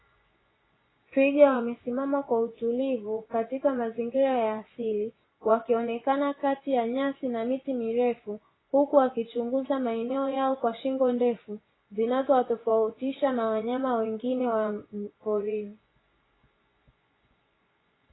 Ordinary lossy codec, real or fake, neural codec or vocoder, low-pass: AAC, 16 kbps; fake; vocoder, 24 kHz, 100 mel bands, Vocos; 7.2 kHz